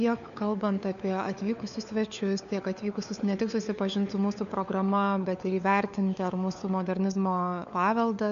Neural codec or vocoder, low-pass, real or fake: codec, 16 kHz, 4 kbps, FunCodec, trained on LibriTTS, 50 frames a second; 7.2 kHz; fake